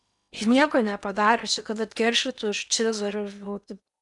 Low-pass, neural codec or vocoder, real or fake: 10.8 kHz; codec, 16 kHz in and 24 kHz out, 0.8 kbps, FocalCodec, streaming, 65536 codes; fake